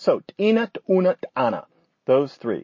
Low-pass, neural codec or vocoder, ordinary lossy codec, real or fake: 7.2 kHz; none; MP3, 32 kbps; real